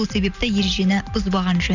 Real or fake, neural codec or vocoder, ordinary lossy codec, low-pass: real; none; none; 7.2 kHz